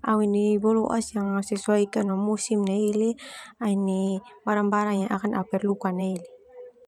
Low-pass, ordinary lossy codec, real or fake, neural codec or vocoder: 19.8 kHz; none; fake; vocoder, 44.1 kHz, 128 mel bands every 512 samples, BigVGAN v2